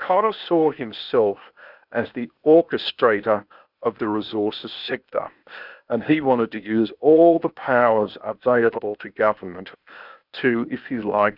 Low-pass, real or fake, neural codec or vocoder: 5.4 kHz; fake; codec, 16 kHz, 0.8 kbps, ZipCodec